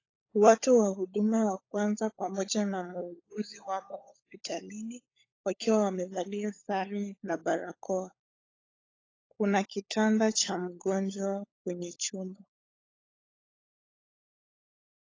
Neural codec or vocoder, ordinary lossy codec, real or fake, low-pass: codec, 16 kHz, 16 kbps, FunCodec, trained on LibriTTS, 50 frames a second; AAC, 32 kbps; fake; 7.2 kHz